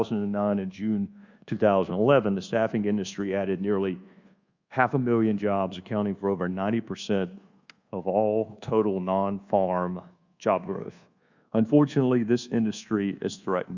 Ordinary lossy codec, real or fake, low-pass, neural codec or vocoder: Opus, 64 kbps; fake; 7.2 kHz; codec, 24 kHz, 1.2 kbps, DualCodec